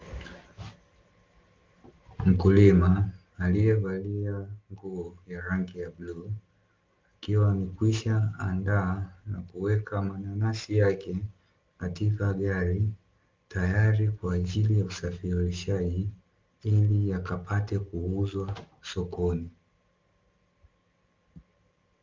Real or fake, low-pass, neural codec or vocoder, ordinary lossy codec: real; 7.2 kHz; none; Opus, 32 kbps